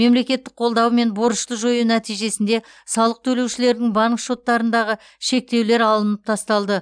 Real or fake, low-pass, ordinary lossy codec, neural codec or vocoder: real; 9.9 kHz; none; none